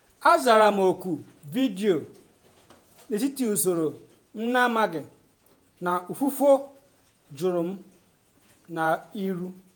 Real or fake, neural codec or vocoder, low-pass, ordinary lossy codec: fake; vocoder, 48 kHz, 128 mel bands, Vocos; none; none